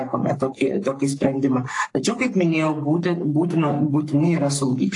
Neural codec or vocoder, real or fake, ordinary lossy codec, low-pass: codec, 44.1 kHz, 3.4 kbps, Pupu-Codec; fake; AAC, 48 kbps; 10.8 kHz